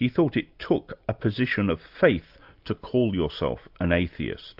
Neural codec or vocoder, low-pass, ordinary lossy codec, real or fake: none; 5.4 kHz; AAC, 48 kbps; real